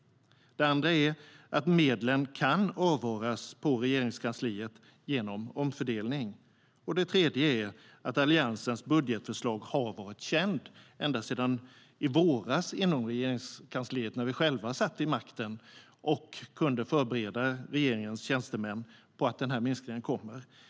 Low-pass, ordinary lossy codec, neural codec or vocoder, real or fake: none; none; none; real